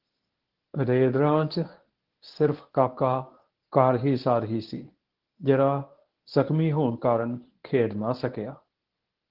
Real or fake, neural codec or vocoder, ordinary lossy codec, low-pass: fake; codec, 24 kHz, 0.9 kbps, WavTokenizer, medium speech release version 1; Opus, 16 kbps; 5.4 kHz